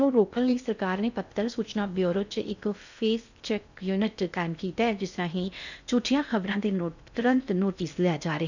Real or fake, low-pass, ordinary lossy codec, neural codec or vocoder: fake; 7.2 kHz; none; codec, 16 kHz in and 24 kHz out, 0.6 kbps, FocalCodec, streaming, 2048 codes